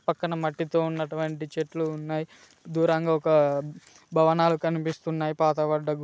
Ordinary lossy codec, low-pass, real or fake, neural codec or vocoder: none; none; real; none